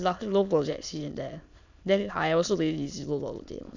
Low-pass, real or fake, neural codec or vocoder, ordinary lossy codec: 7.2 kHz; fake; autoencoder, 22.05 kHz, a latent of 192 numbers a frame, VITS, trained on many speakers; none